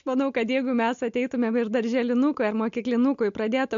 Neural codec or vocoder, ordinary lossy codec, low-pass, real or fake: none; MP3, 48 kbps; 7.2 kHz; real